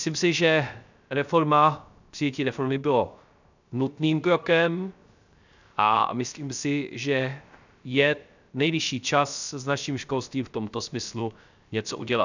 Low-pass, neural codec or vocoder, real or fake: 7.2 kHz; codec, 16 kHz, 0.3 kbps, FocalCodec; fake